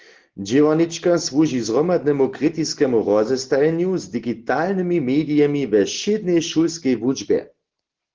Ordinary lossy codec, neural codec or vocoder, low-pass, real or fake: Opus, 16 kbps; none; 7.2 kHz; real